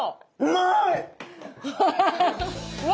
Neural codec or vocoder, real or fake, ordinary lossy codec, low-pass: none; real; none; none